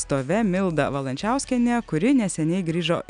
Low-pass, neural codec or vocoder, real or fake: 9.9 kHz; none; real